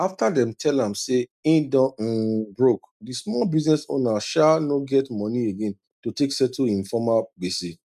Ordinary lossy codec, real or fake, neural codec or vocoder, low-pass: none; real; none; 14.4 kHz